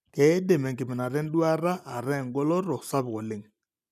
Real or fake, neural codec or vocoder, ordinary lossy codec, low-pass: real; none; none; 14.4 kHz